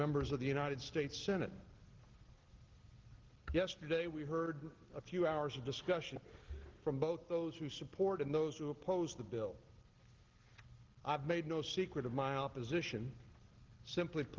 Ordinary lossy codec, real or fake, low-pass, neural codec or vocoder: Opus, 16 kbps; real; 7.2 kHz; none